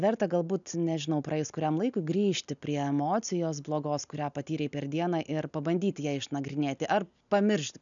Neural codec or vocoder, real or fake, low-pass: none; real; 7.2 kHz